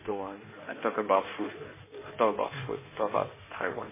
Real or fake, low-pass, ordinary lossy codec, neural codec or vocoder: fake; 3.6 kHz; MP3, 16 kbps; codec, 16 kHz in and 24 kHz out, 1.1 kbps, FireRedTTS-2 codec